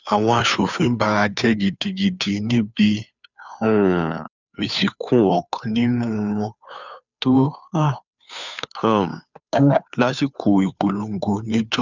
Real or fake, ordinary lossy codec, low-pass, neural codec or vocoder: fake; none; 7.2 kHz; codec, 16 kHz, 2 kbps, FunCodec, trained on Chinese and English, 25 frames a second